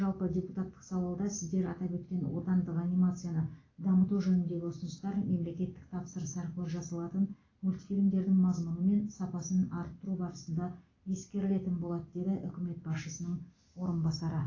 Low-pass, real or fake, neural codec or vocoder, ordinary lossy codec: 7.2 kHz; real; none; AAC, 32 kbps